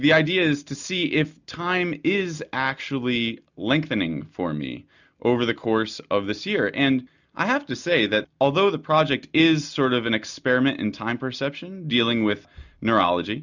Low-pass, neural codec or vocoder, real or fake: 7.2 kHz; none; real